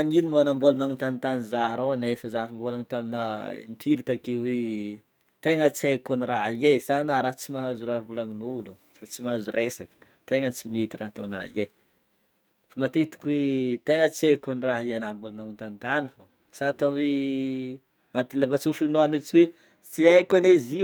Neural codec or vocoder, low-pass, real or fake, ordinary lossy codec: codec, 44.1 kHz, 2.6 kbps, SNAC; none; fake; none